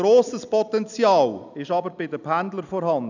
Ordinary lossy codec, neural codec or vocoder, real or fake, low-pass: none; none; real; 7.2 kHz